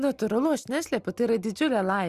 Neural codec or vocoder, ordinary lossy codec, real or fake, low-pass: none; Opus, 64 kbps; real; 14.4 kHz